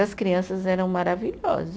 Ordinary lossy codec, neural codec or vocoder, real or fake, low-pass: none; none; real; none